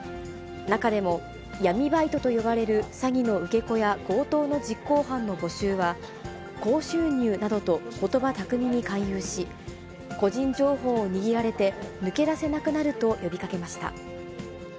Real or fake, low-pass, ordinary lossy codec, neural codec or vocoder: real; none; none; none